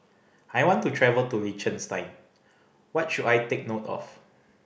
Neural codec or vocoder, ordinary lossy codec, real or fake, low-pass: none; none; real; none